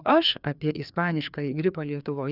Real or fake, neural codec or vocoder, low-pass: fake; codec, 16 kHz, 2 kbps, FreqCodec, larger model; 5.4 kHz